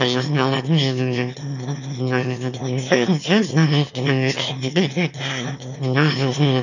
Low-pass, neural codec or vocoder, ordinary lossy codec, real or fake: 7.2 kHz; autoencoder, 22.05 kHz, a latent of 192 numbers a frame, VITS, trained on one speaker; none; fake